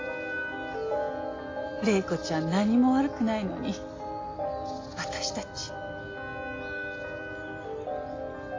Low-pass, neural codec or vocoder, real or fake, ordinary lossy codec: 7.2 kHz; none; real; AAC, 32 kbps